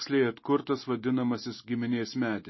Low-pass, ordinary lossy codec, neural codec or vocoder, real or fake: 7.2 kHz; MP3, 24 kbps; none; real